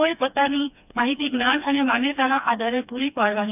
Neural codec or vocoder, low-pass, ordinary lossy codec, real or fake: codec, 16 kHz, 2 kbps, FreqCodec, smaller model; 3.6 kHz; none; fake